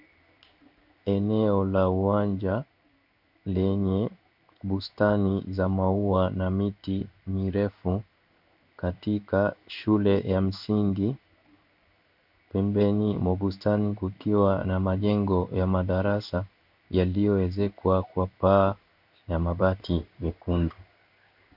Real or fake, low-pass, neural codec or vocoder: fake; 5.4 kHz; codec, 16 kHz in and 24 kHz out, 1 kbps, XY-Tokenizer